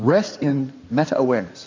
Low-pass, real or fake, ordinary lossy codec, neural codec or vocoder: 7.2 kHz; fake; MP3, 64 kbps; codec, 16 kHz in and 24 kHz out, 2.2 kbps, FireRedTTS-2 codec